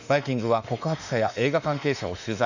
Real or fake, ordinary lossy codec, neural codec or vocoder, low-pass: fake; none; autoencoder, 48 kHz, 32 numbers a frame, DAC-VAE, trained on Japanese speech; 7.2 kHz